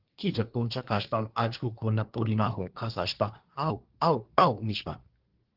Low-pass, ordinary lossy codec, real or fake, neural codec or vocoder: 5.4 kHz; Opus, 16 kbps; fake; codec, 24 kHz, 1 kbps, SNAC